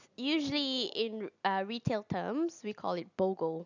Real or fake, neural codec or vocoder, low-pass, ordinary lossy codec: real; none; 7.2 kHz; none